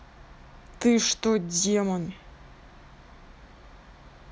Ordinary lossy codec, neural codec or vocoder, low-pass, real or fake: none; none; none; real